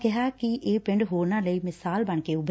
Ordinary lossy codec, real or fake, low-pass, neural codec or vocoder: none; real; none; none